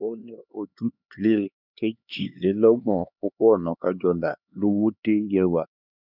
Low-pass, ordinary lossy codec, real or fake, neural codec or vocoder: 5.4 kHz; none; fake; codec, 16 kHz, 2 kbps, X-Codec, HuBERT features, trained on LibriSpeech